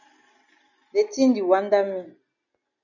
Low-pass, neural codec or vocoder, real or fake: 7.2 kHz; none; real